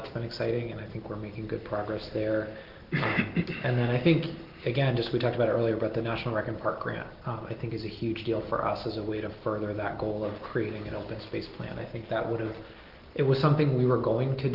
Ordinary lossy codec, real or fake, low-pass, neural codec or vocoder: Opus, 16 kbps; real; 5.4 kHz; none